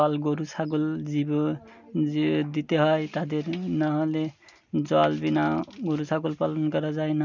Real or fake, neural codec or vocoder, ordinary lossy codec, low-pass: real; none; none; 7.2 kHz